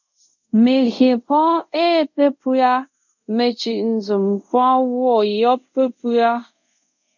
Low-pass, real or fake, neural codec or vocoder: 7.2 kHz; fake; codec, 24 kHz, 0.5 kbps, DualCodec